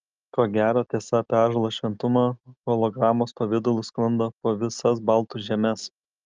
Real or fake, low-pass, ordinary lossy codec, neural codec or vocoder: real; 7.2 kHz; Opus, 24 kbps; none